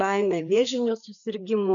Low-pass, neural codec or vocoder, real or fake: 7.2 kHz; codec, 16 kHz, 4 kbps, FreqCodec, larger model; fake